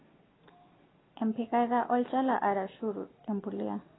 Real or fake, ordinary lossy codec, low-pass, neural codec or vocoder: real; AAC, 16 kbps; 7.2 kHz; none